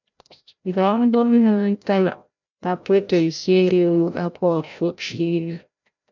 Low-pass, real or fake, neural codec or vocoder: 7.2 kHz; fake; codec, 16 kHz, 0.5 kbps, FreqCodec, larger model